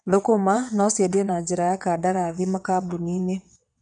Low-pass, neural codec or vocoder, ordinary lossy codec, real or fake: 9.9 kHz; vocoder, 22.05 kHz, 80 mel bands, WaveNeXt; none; fake